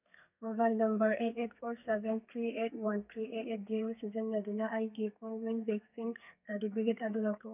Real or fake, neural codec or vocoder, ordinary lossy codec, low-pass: fake; codec, 32 kHz, 1.9 kbps, SNAC; none; 3.6 kHz